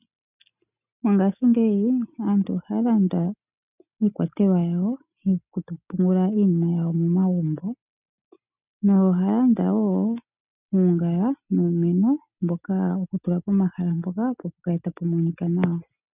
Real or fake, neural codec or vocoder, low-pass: real; none; 3.6 kHz